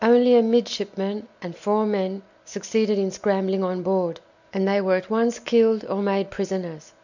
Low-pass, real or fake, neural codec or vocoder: 7.2 kHz; real; none